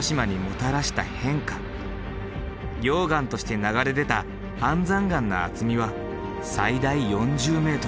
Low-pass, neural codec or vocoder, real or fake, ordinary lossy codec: none; none; real; none